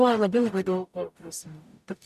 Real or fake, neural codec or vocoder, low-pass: fake; codec, 44.1 kHz, 0.9 kbps, DAC; 14.4 kHz